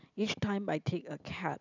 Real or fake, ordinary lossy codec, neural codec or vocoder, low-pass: real; none; none; 7.2 kHz